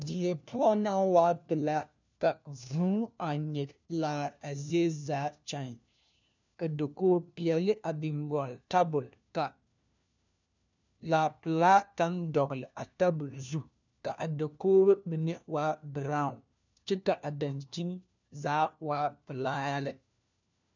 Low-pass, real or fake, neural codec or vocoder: 7.2 kHz; fake; codec, 16 kHz, 1 kbps, FunCodec, trained on LibriTTS, 50 frames a second